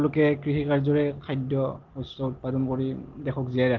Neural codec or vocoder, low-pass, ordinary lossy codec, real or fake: none; 7.2 kHz; Opus, 16 kbps; real